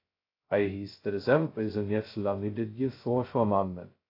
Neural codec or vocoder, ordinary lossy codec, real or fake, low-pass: codec, 16 kHz, 0.2 kbps, FocalCodec; AAC, 32 kbps; fake; 5.4 kHz